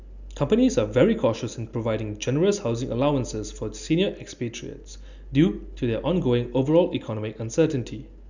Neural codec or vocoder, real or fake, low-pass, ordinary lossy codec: none; real; 7.2 kHz; none